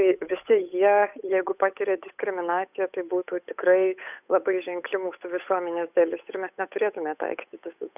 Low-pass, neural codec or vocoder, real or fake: 3.6 kHz; codec, 16 kHz, 8 kbps, FunCodec, trained on Chinese and English, 25 frames a second; fake